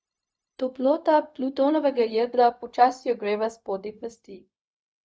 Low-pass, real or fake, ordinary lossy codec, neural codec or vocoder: none; fake; none; codec, 16 kHz, 0.4 kbps, LongCat-Audio-Codec